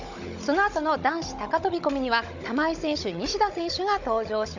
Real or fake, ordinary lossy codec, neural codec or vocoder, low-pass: fake; none; codec, 16 kHz, 16 kbps, FunCodec, trained on Chinese and English, 50 frames a second; 7.2 kHz